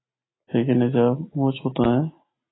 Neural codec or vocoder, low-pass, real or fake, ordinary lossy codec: none; 7.2 kHz; real; AAC, 16 kbps